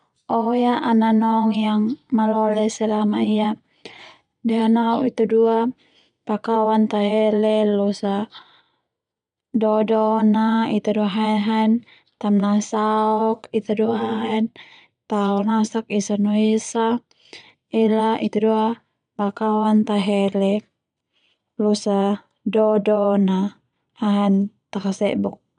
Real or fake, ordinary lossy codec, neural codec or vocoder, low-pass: fake; none; vocoder, 22.05 kHz, 80 mel bands, Vocos; 9.9 kHz